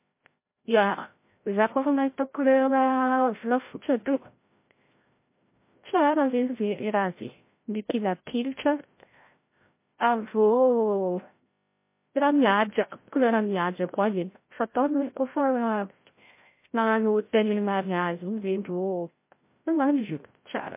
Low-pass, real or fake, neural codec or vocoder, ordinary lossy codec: 3.6 kHz; fake; codec, 16 kHz, 0.5 kbps, FreqCodec, larger model; MP3, 24 kbps